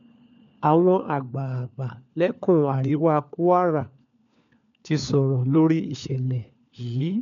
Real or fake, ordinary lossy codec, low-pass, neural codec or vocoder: fake; none; 7.2 kHz; codec, 16 kHz, 4 kbps, FunCodec, trained on LibriTTS, 50 frames a second